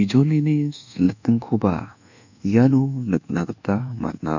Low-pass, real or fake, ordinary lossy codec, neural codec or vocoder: 7.2 kHz; fake; none; codec, 24 kHz, 1.2 kbps, DualCodec